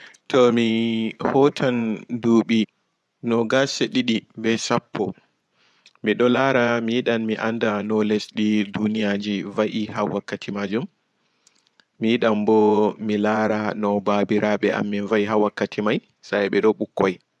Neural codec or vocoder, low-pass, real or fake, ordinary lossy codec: vocoder, 24 kHz, 100 mel bands, Vocos; none; fake; none